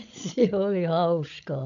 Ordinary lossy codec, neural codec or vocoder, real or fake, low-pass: none; codec, 16 kHz, 16 kbps, FreqCodec, larger model; fake; 7.2 kHz